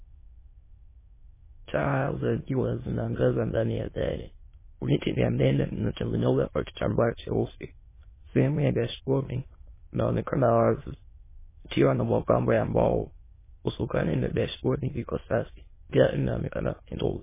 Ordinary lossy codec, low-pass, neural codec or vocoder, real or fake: MP3, 16 kbps; 3.6 kHz; autoencoder, 22.05 kHz, a latent of 192 numbers a frame, VITS, trained on many speakers; fake